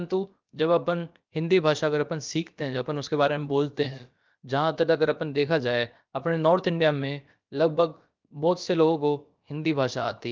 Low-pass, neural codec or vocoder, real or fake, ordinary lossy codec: 7.2 kHz; codec, 16 kHz, about 1 kbps, DyCAST, with the encoder's durations; fake; Opus, 24 kbps